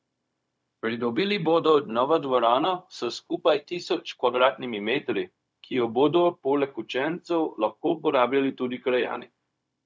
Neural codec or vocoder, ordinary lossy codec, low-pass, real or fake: codec, 16 kHz, 0.4 kbps, LongCat-Audio-Codec; none; none; fake